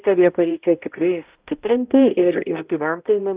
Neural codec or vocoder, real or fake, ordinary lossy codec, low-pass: codec, 16 kHz, 0.5 kbps, X-Codec, HuBERT features, trained on balanced general audio; fake; Opus, 24 kbps; 3.6 kHz